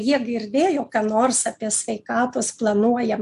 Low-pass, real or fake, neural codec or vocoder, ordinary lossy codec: 10.8 kHz; real; none; AAC, 96 kbps